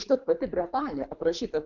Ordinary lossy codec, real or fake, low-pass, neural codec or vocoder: MP3, 64 kbps; fake; 7.2 kHz; codec, 24 kHz, 3 kbps, HILCodec